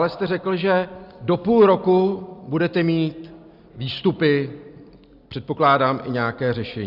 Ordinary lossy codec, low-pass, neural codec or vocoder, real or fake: Opus, 64 kbps; 5.4 kHz; none; real